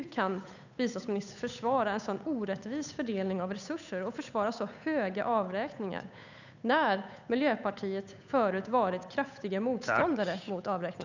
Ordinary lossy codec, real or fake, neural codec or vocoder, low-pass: none; fake; codec, 16 kHz, 8 kbps, FunCodec, trained on Chinese and English, 25 frames a second; 7.2 kHz